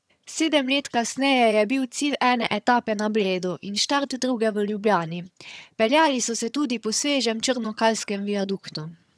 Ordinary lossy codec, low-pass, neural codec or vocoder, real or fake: none; none; vocoder, 22.05 kHz, 80 mel bands, HiFi-GAN; fake